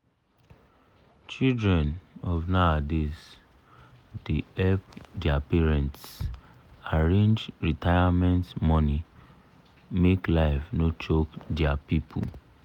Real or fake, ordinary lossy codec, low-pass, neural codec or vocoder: real; none; 19.8 kHz; none